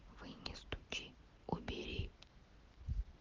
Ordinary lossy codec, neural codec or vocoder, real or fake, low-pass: Opus, 16 kbps; none; real; 7.2 kHz